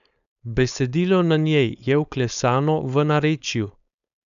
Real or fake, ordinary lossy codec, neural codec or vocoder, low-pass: fake; none; codec, 16 kHz, 4.8 kbps, FACodec; 7.2 kHz